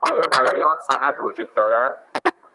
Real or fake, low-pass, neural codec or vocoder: fake; 10.8 kHz; codec, 24 kHz, 1 kbps, SNAC